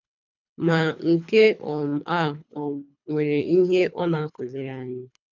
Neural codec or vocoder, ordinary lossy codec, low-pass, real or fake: codec, 24 kHz, 3 kbps, HILCodec; none; 7.2 kHz; fake